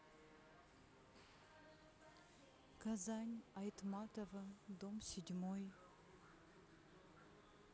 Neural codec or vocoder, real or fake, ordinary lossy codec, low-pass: none; real; none; none